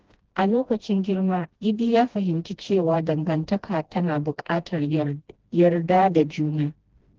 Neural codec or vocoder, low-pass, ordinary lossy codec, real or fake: codec, 16 kHz, 1 kbps, FreqCodec, smaller model; 7.2 kHz; Opus, 16 kbps; fake